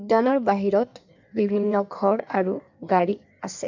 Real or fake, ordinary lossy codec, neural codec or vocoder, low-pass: fake; none; codec, 16 kHz in and 24 kHz out, 1.1 kbps, FireRedTTS-2 codec; 7.2 kHz